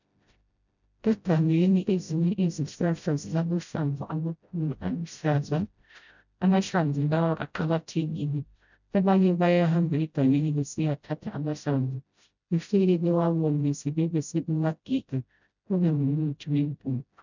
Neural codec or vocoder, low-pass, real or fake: codec, 16 kHz, 0.5 kbps, FreqCodec, smaller model; 7.2 kHz; fake